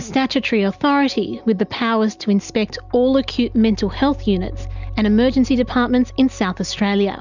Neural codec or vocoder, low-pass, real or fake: none; 7.2 kHz; real